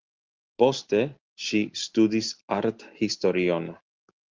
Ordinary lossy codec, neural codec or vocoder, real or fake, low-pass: Opus, 32 kbps; none; real; 7.2 kHz